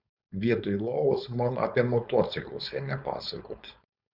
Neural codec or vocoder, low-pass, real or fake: codec, 16 kHz, 4.8 kbps, FACodec; 5.4 kHz; fake